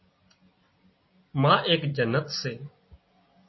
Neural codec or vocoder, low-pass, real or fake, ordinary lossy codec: none; 7.2 kHz; real; MP3, 24 kbps